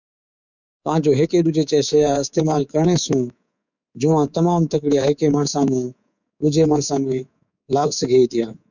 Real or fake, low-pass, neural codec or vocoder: fake; 7.2 kHz; codec, 24 kHz, 3.1 kbps, DualCodec